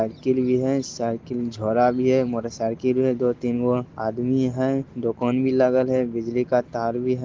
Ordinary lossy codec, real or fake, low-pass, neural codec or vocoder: Opus, 16 kbps; real; 7.2 kHz; none